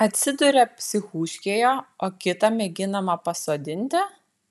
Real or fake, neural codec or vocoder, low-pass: real; none; 14.4 kHz